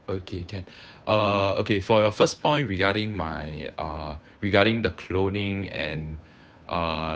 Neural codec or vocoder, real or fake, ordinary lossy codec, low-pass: codec, 16 kHz, 2 kbps, FunCodec, trained on Chinese and English, 25 frames a second; fake; none; none